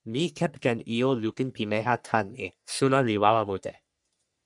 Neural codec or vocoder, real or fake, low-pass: codec, 44.1 kHz, 3.4 kbps, Pupu-Codec; fake; 10.8 kHz